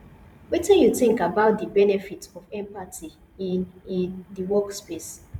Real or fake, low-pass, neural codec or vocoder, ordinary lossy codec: fake; 19.8 kHz; vocoder, 44.1 kHz, 128 mel bands every 512 samples, BigVGAN v2; none